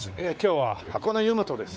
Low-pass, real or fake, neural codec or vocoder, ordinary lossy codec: none; fake; codec, 16 kHz, 4 kbps, X-Codec, WavLM features, trained on Multilingual LibriSpeech; none